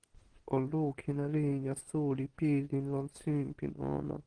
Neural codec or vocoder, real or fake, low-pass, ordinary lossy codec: none; real; 9.9 kHz; Opus, 16 kbps